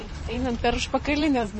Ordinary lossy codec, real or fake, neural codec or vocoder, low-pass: MP3, 32 kbps; fake; vocoder, 44.1 kHz, 128 mel bands, Pupu-Vocoder; 10.8 kHz